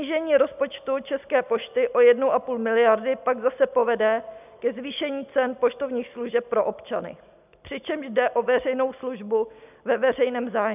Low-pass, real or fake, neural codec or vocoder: 3.6 kHz; real; none